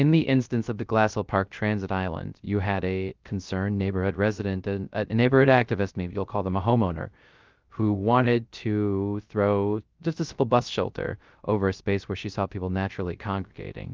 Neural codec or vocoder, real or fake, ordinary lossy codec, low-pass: codec, 16 kHz, 0.3 kbps, FocalCodec; fake; Opus, 32 kbps; 7.2 kHz